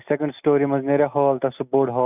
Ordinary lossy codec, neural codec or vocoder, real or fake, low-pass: none; none; real; 3.6 kHz